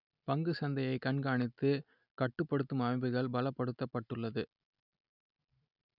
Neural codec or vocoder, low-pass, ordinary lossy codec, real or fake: none; 5.4 kHz; none; real